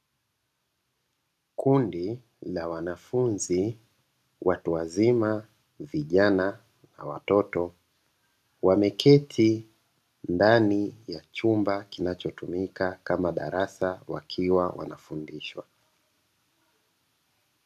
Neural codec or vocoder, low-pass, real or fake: none; 14.4 kHz; real